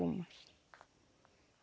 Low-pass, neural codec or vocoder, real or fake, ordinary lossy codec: none; none; real; none